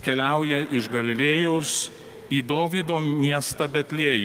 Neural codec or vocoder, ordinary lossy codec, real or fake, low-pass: codec, 32 kHz, 1.9 kbps, SNAC; Opus, 32 kbps; fake; 14.4 kHz